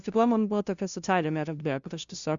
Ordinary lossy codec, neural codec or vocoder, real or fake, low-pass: Opus, 64 kbps; codec, 16 kHz, 0.5 kbps, FunCodec, trained on LibriTTS, 25 frames a second; fake; 7.2 kHz